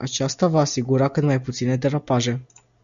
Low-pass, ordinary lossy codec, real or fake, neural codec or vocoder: 7.2 kHz; AAC, 96 kbps; real; none